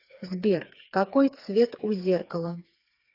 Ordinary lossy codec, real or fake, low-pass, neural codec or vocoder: AAC, 32 kbps; fake; 5.4 kHz; codec, 16 kHz, 4 kbps, FreqCodec, smaller model